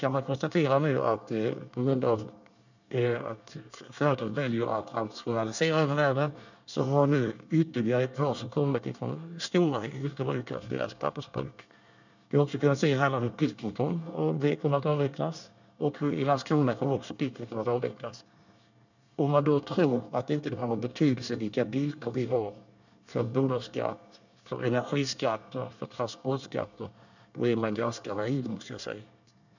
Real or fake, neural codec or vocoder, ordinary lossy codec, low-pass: fake; codec, 24 kHz, 1 kbps, SNAC; none; 7.2 kHz